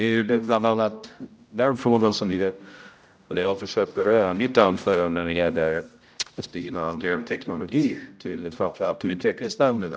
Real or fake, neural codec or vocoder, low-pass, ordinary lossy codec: fake; codec, 16 kHz, 0.5 kbps, X-Codec, HuBERT features, trained on general audio; none; none